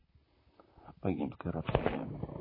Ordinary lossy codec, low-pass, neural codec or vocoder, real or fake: MP3, 24 kbps; 5.4 kHz; vocoder, 44.1 kHz, 128 mel bands, Pupu-Vocoder; fake